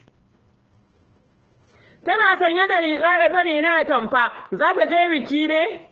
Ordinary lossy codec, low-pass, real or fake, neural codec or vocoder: Opus, 16 kbps; 7.2 kHz; fake; codec, 16 kHz, 2 kbps, FreqCodec, larger model